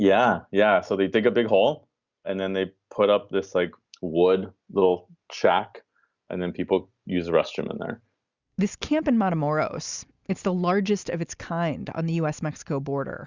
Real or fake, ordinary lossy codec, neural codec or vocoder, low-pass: real; Opus, 64 kbps; none; 7.2 kHz